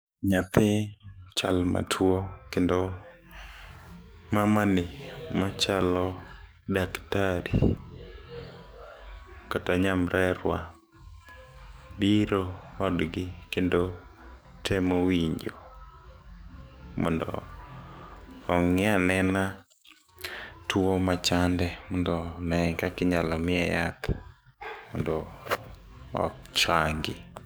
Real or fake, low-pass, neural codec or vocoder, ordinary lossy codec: fake; none; codec, 44.1 kHz, 7.8 kbps, DAC; none